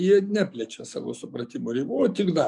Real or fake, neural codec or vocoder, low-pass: fake; codec, 44.1 kHz, 7.8 kbps, DAC; 10.8 kHz